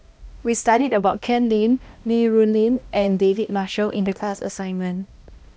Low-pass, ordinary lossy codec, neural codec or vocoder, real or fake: none; none; codec, 16 kHz, 1 kbps, X-Codec, HuBERT features, trained on balanced general audio; fake